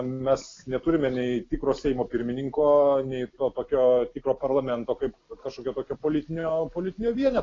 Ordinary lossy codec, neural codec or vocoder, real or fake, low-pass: AAC, 32 kbps; none; real; 7.2 kHz